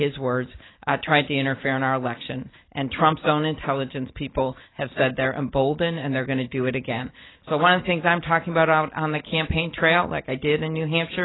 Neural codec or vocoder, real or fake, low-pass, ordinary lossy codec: none; real; 7.2 kHz; AAC, 16 kbps